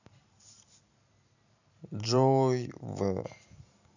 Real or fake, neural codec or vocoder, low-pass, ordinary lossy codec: fake; vocoder, 44.1 kHz, 128 mel bands every 512 samples, BigVGAN v2; 7.2 kHz; none